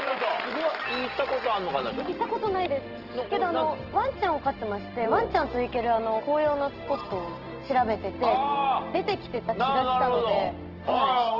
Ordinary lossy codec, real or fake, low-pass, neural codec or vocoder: Opus, 16 kbps; real; 5.4 kHz; none